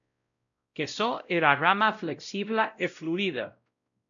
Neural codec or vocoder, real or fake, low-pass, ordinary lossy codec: codec, 16 kHz, 0.5 kbps, X-Codec, WavLM features, trained on Multilingual LibriSpeech; fake; 7.2 kHz; MP3, 96 kbps